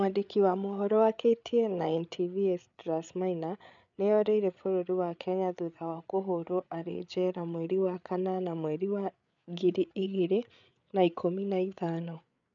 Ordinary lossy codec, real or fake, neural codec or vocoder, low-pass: none; fake; codec, 16 kHz, 8 kbps, FreqCodec, larger model; 7.2 kHz